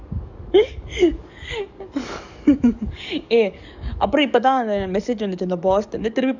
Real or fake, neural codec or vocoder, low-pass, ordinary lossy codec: fake; codec, 44.1 kHz, 7.8 kbps, DAC; 7.2 kHz; none